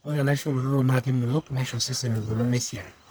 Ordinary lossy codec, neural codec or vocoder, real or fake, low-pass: none; codec, 44.1 kHz, 1.7 kbps, Pupu-Codec; fake; none